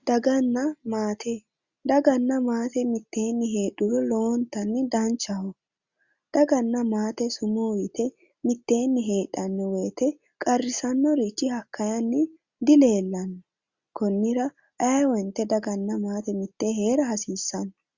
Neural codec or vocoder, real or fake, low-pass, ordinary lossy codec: none; real; 7.2 kHz; Opus, 64 kbps